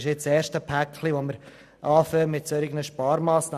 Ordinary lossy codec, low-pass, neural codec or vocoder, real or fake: none; 14.4 kHz; none; real